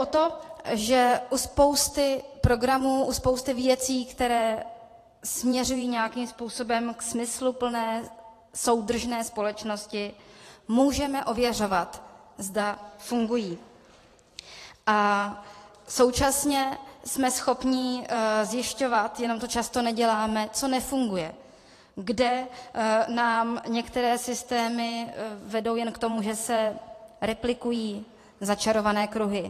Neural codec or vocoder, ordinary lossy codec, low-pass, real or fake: vocoder, 44.1 kHz, 128 mel bands every 512 samples, BigVGAN v2; AAC, 48 kbps; 14.4 kHz; fake